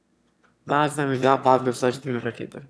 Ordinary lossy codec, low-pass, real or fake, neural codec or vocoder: none; none; fake; autoencoder, 22.05 kHz, a latent of 192 numbers a frame, VITS, trained on one speaker